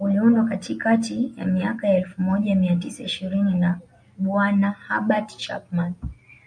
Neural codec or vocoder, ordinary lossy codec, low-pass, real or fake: none; MP3, 64 kbps; 9.9 kHz; real